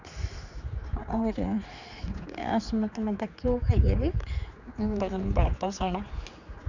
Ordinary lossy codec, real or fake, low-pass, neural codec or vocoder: none; fake; 7.2 kHz; codec, 16 kHz, 4 kbps, X-Codec, HuBERT features, trained on general audio